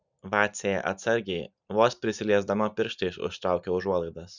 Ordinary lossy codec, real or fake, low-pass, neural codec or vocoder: Opus, 64 kbps; real; 7.2 kHz; none